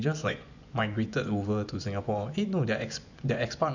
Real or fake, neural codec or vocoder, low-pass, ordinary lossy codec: fake; vocoder, 24 kHz, 100 mel bands, Vocos; 7.2 kHz; none